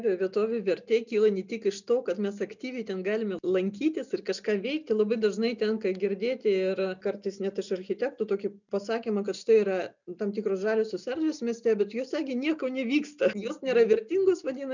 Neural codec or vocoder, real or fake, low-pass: none; real; 7.2 kHz